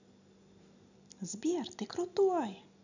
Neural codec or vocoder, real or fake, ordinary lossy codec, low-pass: none; real; none; 7.2 kHz